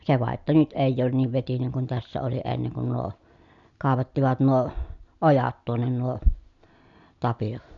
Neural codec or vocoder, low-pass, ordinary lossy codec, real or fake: none; 7.2 kHz; none; real